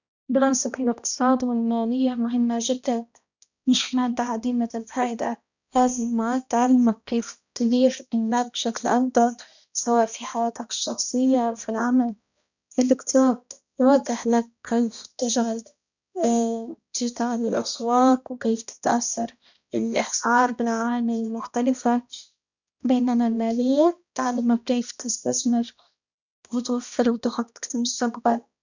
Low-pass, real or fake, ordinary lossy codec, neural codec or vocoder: 7.2 kHz; fake; none; codec, 16 kHz, 1 kbps, X-Codec, HuBERT features, trained on balanced general audio